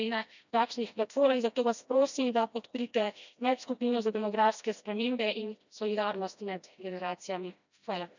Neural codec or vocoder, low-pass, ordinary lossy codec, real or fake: codec, 16 kHz, 1 kbps, FreqCodec, smaller model; 7.2 kHz; none; fake